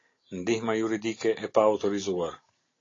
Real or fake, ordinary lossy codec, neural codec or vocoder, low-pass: real; AAC, 32 kbps; none; 7.2 kHz